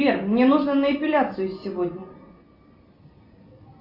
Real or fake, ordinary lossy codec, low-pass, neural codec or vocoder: real; AAC, 48 kbps; 5.4 kHz; none